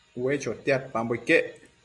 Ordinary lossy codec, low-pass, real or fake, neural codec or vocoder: MP3, 64 kbps; 10.8 kHz; real; none